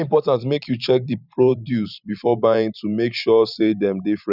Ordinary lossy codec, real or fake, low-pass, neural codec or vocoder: none; fake; 5.4 kHz; vocoder, 44.1 kHz, 128 mel bands every 512 samples, BigVGAN v2